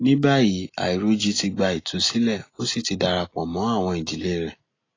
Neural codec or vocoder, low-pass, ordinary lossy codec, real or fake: none; 7.2 kHz; AAC, 32 kbps; real